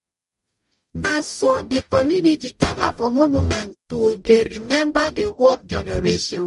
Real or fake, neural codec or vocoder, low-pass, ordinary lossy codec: fake; codec, 44.1 kHz, 0.9 kbps, DAC; 14.4 kHz; MP3, 48 kbps